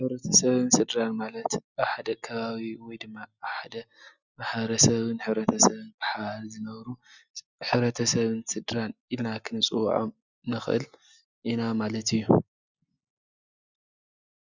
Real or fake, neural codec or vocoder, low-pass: real; none; 7.2 kHz